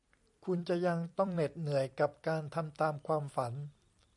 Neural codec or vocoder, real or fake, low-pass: vocoder, 44.1 kHz, 128 mel bands every 256 samples, BigVGAN v2; fake; 10.8 kHz